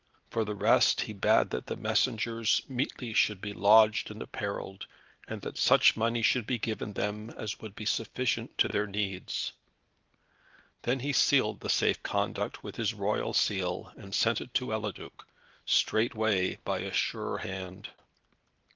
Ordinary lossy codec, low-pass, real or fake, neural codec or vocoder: Opus, 32 kbps; 7.2 kHz; real; none